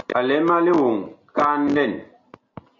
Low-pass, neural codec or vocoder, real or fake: 7.2 kHz; none; real